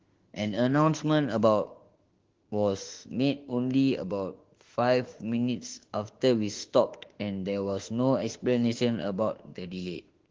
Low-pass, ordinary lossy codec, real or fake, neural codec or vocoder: 7.2 kHz; Opus, 16 kbps; fake; autoencoder, 48 kHz, 32 numbers a frame, DAC-VAE, trained on Japanese speech